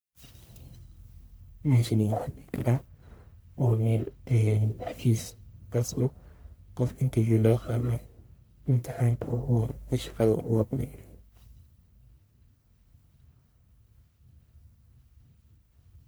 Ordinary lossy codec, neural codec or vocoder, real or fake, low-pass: none; codec, 44.1 kHz, 1.7 kbps, Pupu-Codec; fake; none